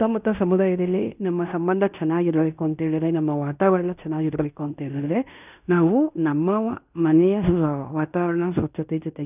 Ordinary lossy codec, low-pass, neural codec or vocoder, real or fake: none; 3.6 kHz; codec, 16 kHz in and 24 kHz out, 0.9 kbps, LongCat-Audio-Codec, fine tuned four codebook decoder; fake